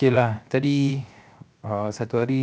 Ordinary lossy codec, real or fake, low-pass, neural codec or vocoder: none; fake; none; codec, 16 kHz, 0.7 kbps, FocalCodec